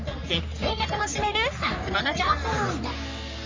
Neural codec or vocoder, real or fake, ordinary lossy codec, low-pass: codec, 44.1 kHz, 3.4 kbps, Pupu-Codec; fake; MP3, 64 kbps; 7.2 kHz